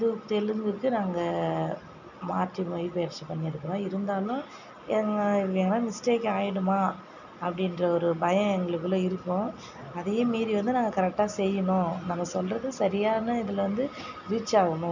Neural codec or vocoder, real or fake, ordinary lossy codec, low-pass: none; real; none; 7.2 kHz